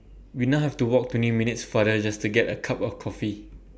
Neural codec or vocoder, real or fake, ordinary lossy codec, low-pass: none; real; none; none